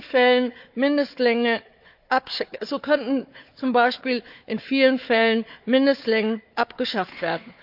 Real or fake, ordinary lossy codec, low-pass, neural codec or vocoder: fake; AAC, 48 kbps; 5.4 kHz; codec, 16 kHz, 4 kbps, FunCodec, trained on Chinese and English, 50 frames a second